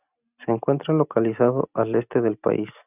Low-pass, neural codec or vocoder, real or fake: 3.6 kHz; none; real